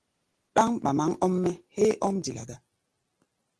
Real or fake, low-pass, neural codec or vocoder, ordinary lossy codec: real; 10.8 kHz; none; Opus, 16 kbps